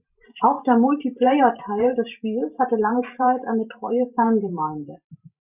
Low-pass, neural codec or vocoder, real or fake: 3.6 kHz; vocoder, 44.1 kHz, 128 mel bands every 512 samples, BigVGAN v2; fake